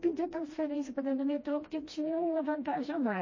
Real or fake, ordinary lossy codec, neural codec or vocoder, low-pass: fake; MP3, 32 kbps; codec, 16 kHz, 1 kbps, FreqCodec, smaller model; 7.2 kHz